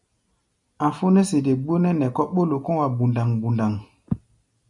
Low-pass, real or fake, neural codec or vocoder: 10.8 kHz; real; none